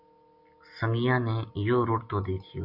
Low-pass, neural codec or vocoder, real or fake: 5.4 kHz; none; real